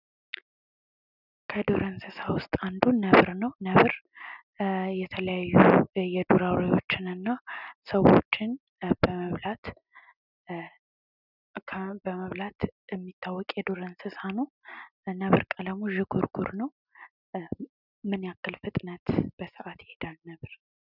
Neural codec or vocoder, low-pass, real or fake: none; 5.4 kHz; real